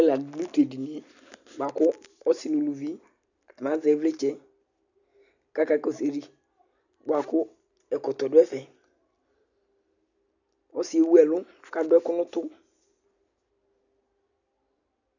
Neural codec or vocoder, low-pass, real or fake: none; 7.2 kHz; real